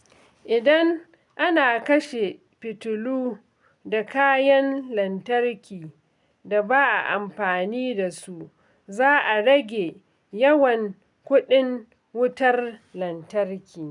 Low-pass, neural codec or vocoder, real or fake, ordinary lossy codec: 10.8 kHz; none; real; none